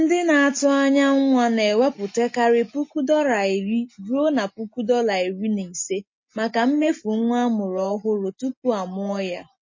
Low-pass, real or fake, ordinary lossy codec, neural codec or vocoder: 7.2 kHz; real; MP3, 32 kbps; none